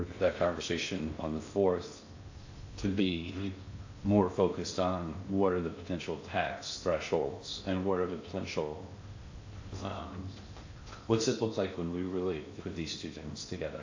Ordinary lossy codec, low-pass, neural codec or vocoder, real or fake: AAC, 48 kbps; 7.2 kHz; codec, 16 kHz in and 24 kHz out, 0.6 kbps, FocalCodec, streaming, 2048 codes; fake